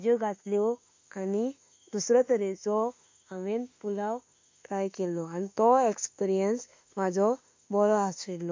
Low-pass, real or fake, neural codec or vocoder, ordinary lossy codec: 7.2 kHz; fake; autoencoder, 48 kHz, 32 numbers a frame, DAC-VAE, trained on Japanese speech; MP3, 48 kbps